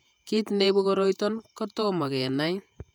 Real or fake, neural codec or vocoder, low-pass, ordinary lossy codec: fake; vocoder, 48 kHz, 128 mel bands, Vocos; 19.8 kHz; none